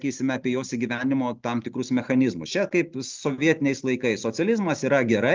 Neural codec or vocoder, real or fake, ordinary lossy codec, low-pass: none; real; Opus, 24 kbps; 7.2 kHz